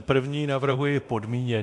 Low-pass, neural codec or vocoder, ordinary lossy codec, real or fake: 10.8 kHz; codec, 24 kHz, 0.9 kbps, DualCodec; MP3, 64 kbps; fake